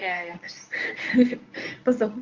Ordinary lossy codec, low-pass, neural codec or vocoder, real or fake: Opus, 24 kbps; 7.2 kHz; codec, 24 kHz, 0.9 kbps, WavTokenizer, medium speech release version 1; fake